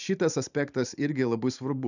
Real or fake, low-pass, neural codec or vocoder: fake; 7.2 kHz; vocoder, 44.1 kHz, 128 mel bands every 512 samples, BigVGAN v2